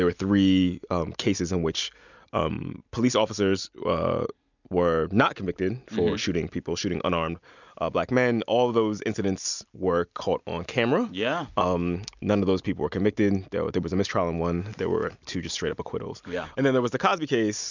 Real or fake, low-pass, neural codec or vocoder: real; 7.2 kHz; none